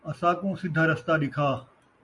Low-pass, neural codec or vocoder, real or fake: 10.8 kHz; none; real